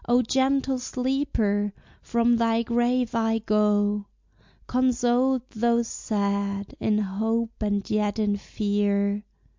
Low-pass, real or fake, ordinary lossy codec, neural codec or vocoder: 7.2 kHz; real; MP3, 64 kbps; none